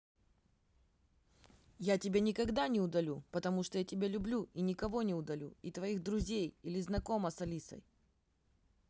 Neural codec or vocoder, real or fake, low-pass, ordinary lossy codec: none; real; none; none